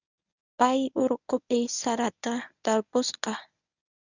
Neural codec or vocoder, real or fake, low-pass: codec, 24 kHz, 0.9 kbps, WavTokenizer, medium speech release version 1; fake; 7.2 kHz